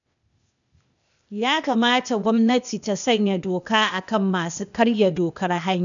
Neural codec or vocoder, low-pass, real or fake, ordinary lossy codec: codec, 16 kHz, 0.8 kbps, ZipCodec; 7.2 kHz; fake; none